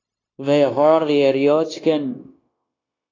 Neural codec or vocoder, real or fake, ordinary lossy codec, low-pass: codec, 16 kHz, 0.9 kbps, LongCat-Audio-Codec; fake; AAC, 32 kbps; 7.2 kHz